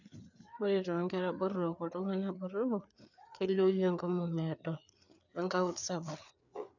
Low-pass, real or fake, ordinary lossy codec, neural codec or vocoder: 7.2 kHz; fake; none; codec, 16 kHz, 4 kbps, FreqCodec, larger model